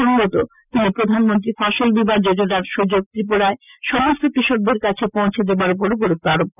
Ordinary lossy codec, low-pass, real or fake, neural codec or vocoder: none; 3.6 kHz; real; none